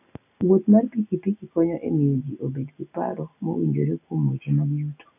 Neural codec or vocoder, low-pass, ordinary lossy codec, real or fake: none; 3.6 kHz; none; real